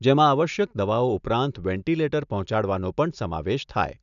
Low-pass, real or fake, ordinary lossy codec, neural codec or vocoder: 7.2 kHz; real; none; none